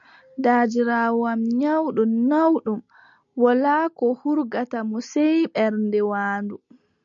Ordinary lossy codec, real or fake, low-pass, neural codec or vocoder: MP3, 96 kbps; real; 7.2 kHz; none